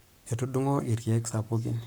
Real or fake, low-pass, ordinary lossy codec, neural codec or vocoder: fake; none; none; codec, 44.1 kHz, 7.8 kbps, Pupu-Codec